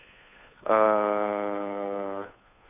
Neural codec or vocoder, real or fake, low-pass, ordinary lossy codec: codec, 16 kHz, 2 kbps, FunCodec, trained on Chinese and English, 25 frames a second; fake; 3.6 kHz; none